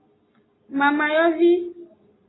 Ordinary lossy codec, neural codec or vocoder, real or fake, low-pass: AAC, 16 kbps; none; real; 7.2 kHz